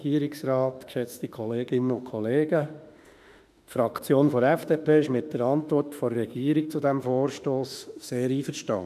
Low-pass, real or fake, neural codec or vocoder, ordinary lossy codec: 14.4 kHz; fake; autoencoder, 48 kHz, 32 numbers a frame, DAC-VAE, trained on Japanese speech; none